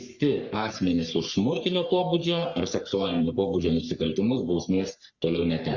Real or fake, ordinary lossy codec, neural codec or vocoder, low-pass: fake; Opus, 64 kbps; codec, 44.1 kHz, 3.4 kbps, Pupu-Codec; 7.2 kHz